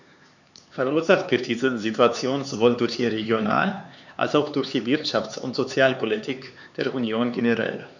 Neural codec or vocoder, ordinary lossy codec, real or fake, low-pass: codec, 16 kHz, 4 kbps, X-Codec, HuBERT features, trained on LibriSpeech; none; fake; 7.2 kHz